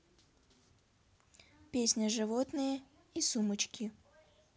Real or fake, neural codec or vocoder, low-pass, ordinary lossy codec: real; none; none; none